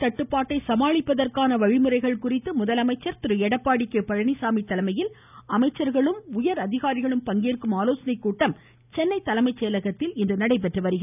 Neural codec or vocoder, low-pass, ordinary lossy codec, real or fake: none; 3.6 kHz; none; real